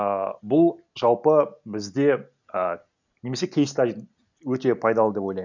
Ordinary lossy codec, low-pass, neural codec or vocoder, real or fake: none; 7.2 kHz; none; real